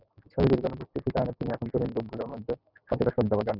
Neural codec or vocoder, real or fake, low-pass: none; real; 5.4 kHz